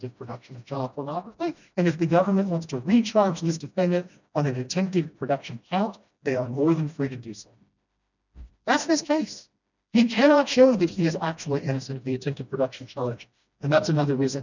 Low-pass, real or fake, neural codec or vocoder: 7.2 kHz; fake; codec, 16 kHz, 1 kbps, FreqCodec, smaller model